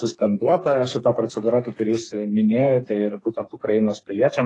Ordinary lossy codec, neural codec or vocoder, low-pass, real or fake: AAC, 32 kbps; codec, 32 kHz, 1.9 kbps, SNAC; 10.8 kHz; fake